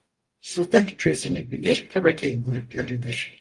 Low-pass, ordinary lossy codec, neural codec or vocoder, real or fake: 10.8 kHz; Opus, 32 kbps; codec, 44.1 kHz, 0.9 kbps, DAC; fake